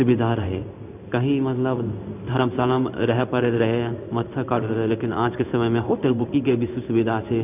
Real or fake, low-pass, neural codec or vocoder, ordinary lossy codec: fake; 3.6 kHz; codec, 16 kHz in and 24 kHz out, 1 kbps, XY-Tokenizer; none